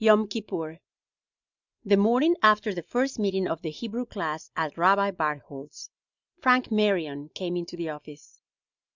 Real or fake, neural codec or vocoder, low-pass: real; none; 7.2 kHz